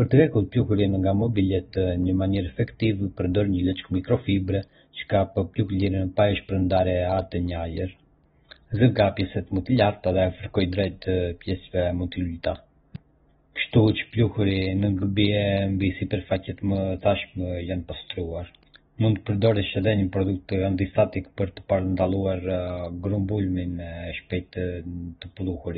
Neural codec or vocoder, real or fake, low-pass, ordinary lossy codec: none; real; 19.8 kHz; AAC, 16 kbps